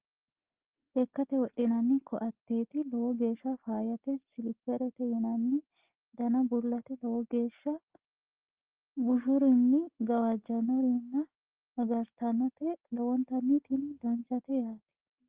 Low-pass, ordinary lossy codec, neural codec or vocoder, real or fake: 3.6 kHz; Opus, 16 kbps; none; real